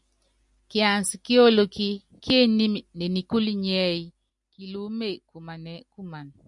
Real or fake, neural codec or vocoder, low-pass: real; none; 10.8 kHz